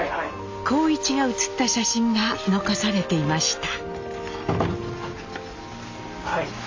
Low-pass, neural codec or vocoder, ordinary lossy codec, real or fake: 7.2 kHz; none; AAC, 48 kbps; real